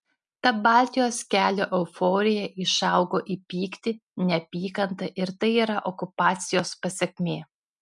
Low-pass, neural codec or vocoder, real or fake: 10.8 kHz; none; real